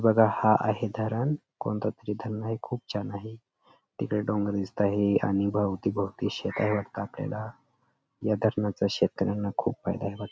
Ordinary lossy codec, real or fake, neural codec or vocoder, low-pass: none; real; none; none